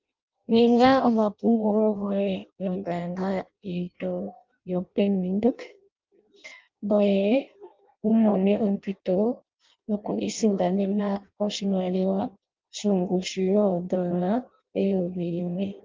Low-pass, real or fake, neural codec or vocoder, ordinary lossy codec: 7.2 kHz; fake; codec, 16 kHz in and 24 kHz out, 0.6 kbps, FireRedTTS-2 codec; Opus, 24 kbps